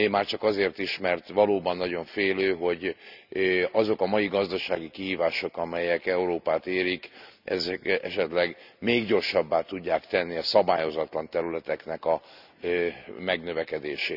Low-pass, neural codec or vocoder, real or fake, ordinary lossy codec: 5.4 kHz; none; real; none